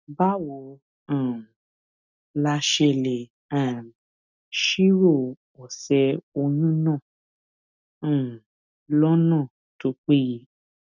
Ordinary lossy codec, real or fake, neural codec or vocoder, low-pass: none; real; none; 7.2 kHz